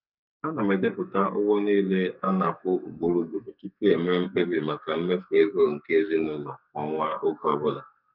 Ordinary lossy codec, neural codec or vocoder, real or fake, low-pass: none; codec, 44.1 kHz, 2.6 kbps, SNAC; fake; 5.4 kHz